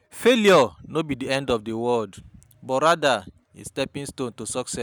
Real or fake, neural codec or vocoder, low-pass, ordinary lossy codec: real; none; none; none